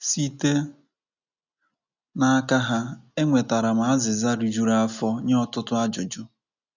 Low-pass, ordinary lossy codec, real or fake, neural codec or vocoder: 7.2 kHz; none; real; none